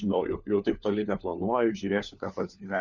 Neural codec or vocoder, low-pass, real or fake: codec, 16 kHz, 4 kbps, FunCodec, trained on LibriTTS, 50 frames a second; 7.2 kHz; fake